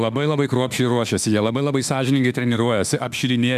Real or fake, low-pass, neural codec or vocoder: fake; 14.4 kHz; autoencoder, 48 kHz, 32 numbers a frame, DAC-VAE, trained on Japanese speech